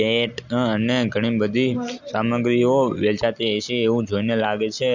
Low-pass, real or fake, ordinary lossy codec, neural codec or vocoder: 7.2 kHz; real; none; none